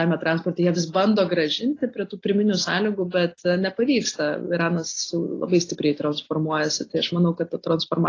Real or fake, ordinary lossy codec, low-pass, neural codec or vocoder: real; AAC, 32 kbps; 7.2 kHz; none